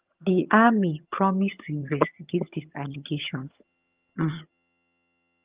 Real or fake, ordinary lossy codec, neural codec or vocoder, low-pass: fake; Opus, 24 kbps; vocoder, 22.05 kHz, 80 mel bands, HiFi-GAN; 3.6 kHz